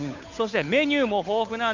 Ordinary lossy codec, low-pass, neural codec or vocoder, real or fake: none; 7.2 kHz; codec, 16 kHz, 8 kbps, FunCodec, trained on Chinese and English, 25 frames a second; fake